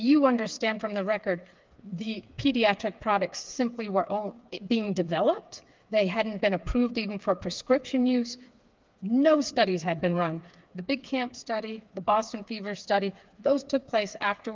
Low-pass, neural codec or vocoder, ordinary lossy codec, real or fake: 7.2 kHz; codec, 16 kHz, 4 kbps, FreqCodec, smaller model; Opus, 32 kbps; fake